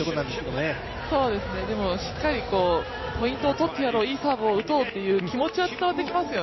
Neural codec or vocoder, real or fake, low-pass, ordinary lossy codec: none; real; 7.2 kHz; MP3, 24 kbps